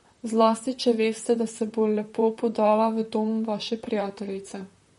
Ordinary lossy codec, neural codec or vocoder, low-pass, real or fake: MP3, 48 kbps; vocoder, 44.1 kHz, 128 mel bands, Pupu-Vocoder; 19.8 kHz; fake